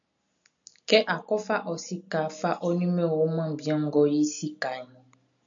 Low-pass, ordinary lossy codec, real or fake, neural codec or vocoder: 7.2 kHz; AAC, 64 kbps; real; none